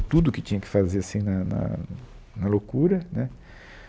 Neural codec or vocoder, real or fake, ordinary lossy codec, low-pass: none; real; none; none